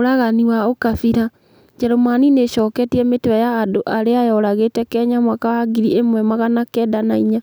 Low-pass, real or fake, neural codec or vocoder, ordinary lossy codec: none; real; none; none